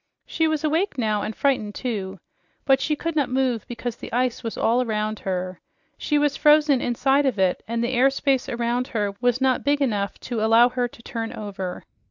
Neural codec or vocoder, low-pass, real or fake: none; 7.2 kHz; real